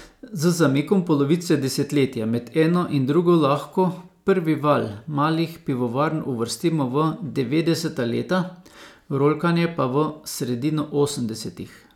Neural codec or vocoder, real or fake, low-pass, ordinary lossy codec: none; real; 19.8 kHz; none